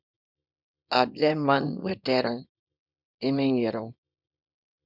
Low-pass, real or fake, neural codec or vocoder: 5.4 kHz; fake; codec, 24 kHz, 0.9 kbps, WavTokenizer, small release